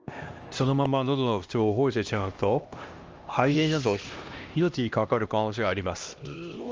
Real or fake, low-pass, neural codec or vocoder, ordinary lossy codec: fake; 7.2 kHz; codec, 16 kHz, 1 kbps, X-Codec, HuBERT features, trained on LibriSpeech; Opus, 24 kbps